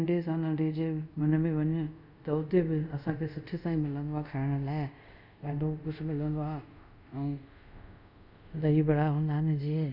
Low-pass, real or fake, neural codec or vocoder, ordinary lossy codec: 5.4 kHz; fake; codec, 24 kHz, 0.5 kbps, DualCodec; none